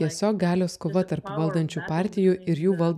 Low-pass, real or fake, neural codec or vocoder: 14.4 kHz; real; none